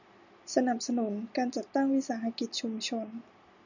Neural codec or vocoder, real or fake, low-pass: none; real; 7.2 kHz